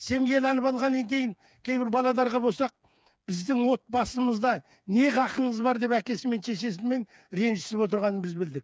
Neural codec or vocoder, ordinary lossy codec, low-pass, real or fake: codec, 16 kHz, 4 kbps, FreqCodec, smaller model; none; none; fake